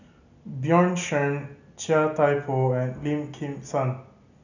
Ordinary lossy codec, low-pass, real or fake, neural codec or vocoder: none; 7.2 kHz; real; none